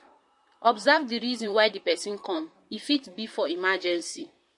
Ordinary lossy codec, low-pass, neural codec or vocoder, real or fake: MP3, 48 kbps; 10.8 kHz; vocoder, 44.1 kHz, 128 mel bands, Pupu-Vocoder; fake